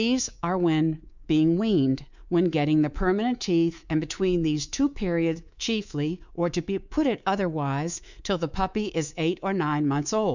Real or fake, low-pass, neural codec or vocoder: fake; 7.2 kHz; codec, 24 kHz, 3.1 kbps, DualCodec